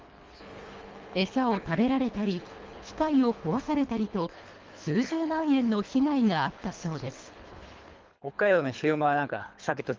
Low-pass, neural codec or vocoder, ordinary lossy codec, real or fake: 7.2 kHz; codec, 24 kHz, 3 kbps, HILCodec; Opus, 32 kbps; fake